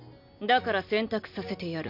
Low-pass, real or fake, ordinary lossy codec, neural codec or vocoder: 5.4 kHz; real; MP3, 48 kbps; none